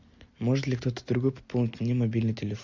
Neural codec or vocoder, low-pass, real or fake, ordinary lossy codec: none; 7.2 kHz; real; MP3, 64 kbps